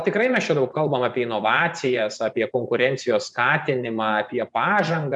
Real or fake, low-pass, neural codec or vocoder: fake; 10.8 kHz; vocoder, 24 kHz, 100 mel bands, Vocos